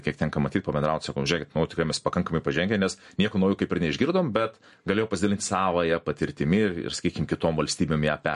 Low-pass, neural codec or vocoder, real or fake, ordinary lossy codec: 14.4 kHz; none; real; MP3, 48 kbps